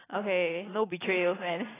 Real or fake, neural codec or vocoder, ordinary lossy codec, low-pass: real; none; AAC, 16 kbps; 3.6 kHz